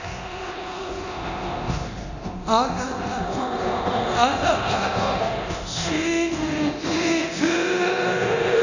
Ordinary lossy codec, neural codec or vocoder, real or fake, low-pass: none; codec, 24 kHz, 0.9 kbps, DualCodec; fake; 7.2 kHz